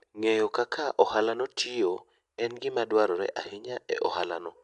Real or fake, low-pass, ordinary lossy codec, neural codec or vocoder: real; 10.8 kHz; AAC, 96 kbps; none